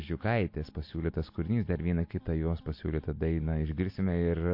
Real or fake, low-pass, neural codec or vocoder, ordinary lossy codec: real; 5.4 kHz; none; MP3, 32 kbps